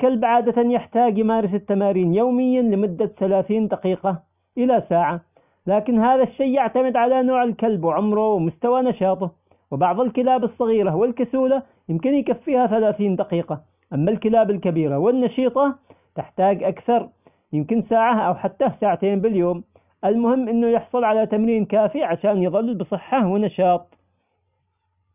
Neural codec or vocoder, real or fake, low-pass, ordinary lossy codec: none; real; 3.6 kHz; none